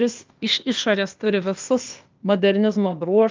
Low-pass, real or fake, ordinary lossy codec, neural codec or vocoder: 7.2 kHz; fake; Opus, 24 kbps; codec, 16 kHz, 0.8 kbps, ZipCodec